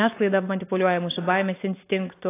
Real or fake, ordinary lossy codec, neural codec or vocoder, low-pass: real; AAC, 24 kbps; none; 3.6 kHz